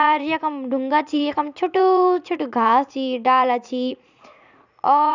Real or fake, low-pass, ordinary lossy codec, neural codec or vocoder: fake; 7.2 kHz; none; vocoder, 44.1 kHz, 80 mel bands, Vocos